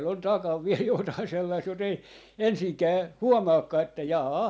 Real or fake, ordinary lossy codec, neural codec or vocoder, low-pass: real; none; none; none